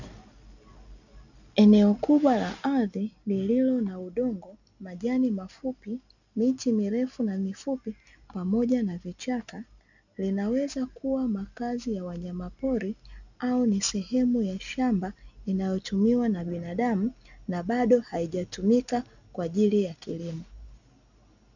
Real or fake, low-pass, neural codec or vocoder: real; 7.2 kHz; none